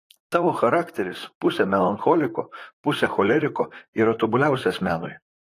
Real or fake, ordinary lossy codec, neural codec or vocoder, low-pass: fake; AAC, 48 kbps; vocoder, 44.1 kHz, 128 mel bands, Pupu-Vocoder; 14.4 kHz